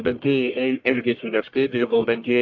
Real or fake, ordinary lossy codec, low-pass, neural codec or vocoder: fake; AAC, 48 kbps; 7.2 kHz; codec, 44.1 kHz, 1.7 kbps, Pupu-Codec